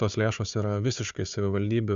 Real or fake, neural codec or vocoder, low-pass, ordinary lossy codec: fake; codec, 16 kHz, 4 kbps, FunCodec, trained on Chinese and English, 50 frames a second; 7.2 kHz; Opus, 64 kbps